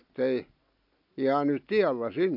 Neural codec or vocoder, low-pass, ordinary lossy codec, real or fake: none; 5.4 kHz; AAC, 48 kbps; real